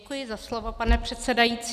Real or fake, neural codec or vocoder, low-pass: real; none; 14.4 kHz